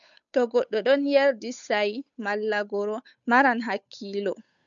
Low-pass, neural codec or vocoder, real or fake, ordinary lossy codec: 7.2 kHz; codec, 16 kHz, 8 kbps, FunCodec, trained on Chinese and English, 25 frames a second; fake; MP3, 96 kbps